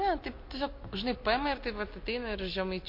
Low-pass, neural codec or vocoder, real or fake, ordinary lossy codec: 5.4 kHz; none; real; MP3, 32 kbps